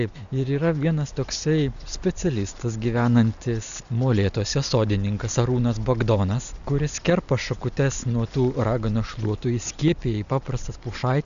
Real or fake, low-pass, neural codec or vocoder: real; 7.2 kHz; none